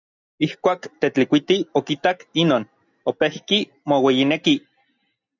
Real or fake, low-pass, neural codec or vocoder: real; 7.2 kHz; none